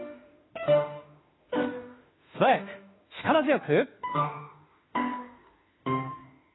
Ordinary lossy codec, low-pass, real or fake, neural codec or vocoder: AAC, 16 kbps; 7.2 kHz; fake; autoencoder, 48 kHz, 32 numbers a frame, DAC-VAE, trained on Japanese speech